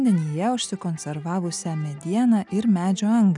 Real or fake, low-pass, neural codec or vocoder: real; 10.8 kHz; none